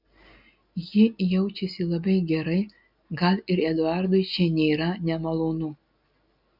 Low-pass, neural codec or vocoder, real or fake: 5.4 kHz; none; real